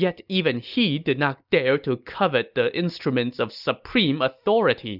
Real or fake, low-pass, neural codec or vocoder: real; 5.4 kHz; none